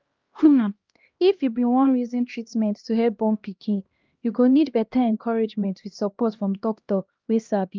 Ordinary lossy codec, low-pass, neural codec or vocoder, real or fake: Opus, 24 kbps; 7.2 kHz; codec, 16 kHz, 1 kbps, X-Codec, HuBERT features, trained on LibriSpeech; fake